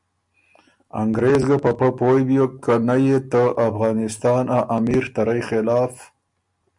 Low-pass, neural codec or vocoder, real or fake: 10.8 kHz; none; real